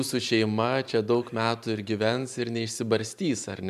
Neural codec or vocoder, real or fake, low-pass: vocoder, 44.1 kHz, 128 mel bands every 512 samples, BigVGAN v2; fake; 14.4 kHz